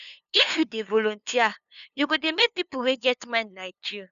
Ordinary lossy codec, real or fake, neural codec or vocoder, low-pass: none; fake; codec, 16 kHz, 2 kbps, FunCodec, trained on LibriTTS, 25 frames a second; 7.2 kHz